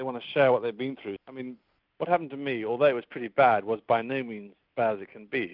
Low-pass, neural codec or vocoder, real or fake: 5.4 kHz; none; real